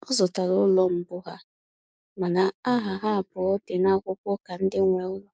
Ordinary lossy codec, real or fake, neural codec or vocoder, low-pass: none; fake; codec, 16 kHz, 6 kbps, DAC; none